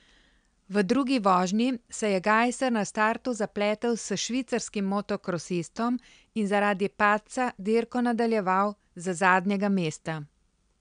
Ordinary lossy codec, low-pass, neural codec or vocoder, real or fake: none; 9.9 kHz; none; real